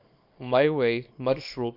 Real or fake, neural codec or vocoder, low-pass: fake; codec, 24 kHz, 0.9 kbps, WavTokenizer, small release; 5.4 kHz